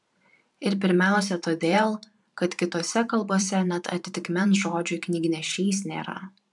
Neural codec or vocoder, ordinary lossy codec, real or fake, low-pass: vocoder, 44.1 kHz, 128 mel bands every 512 samples, BigVGAN v2; MP3, 96 kbps; fake; 10.8 kHz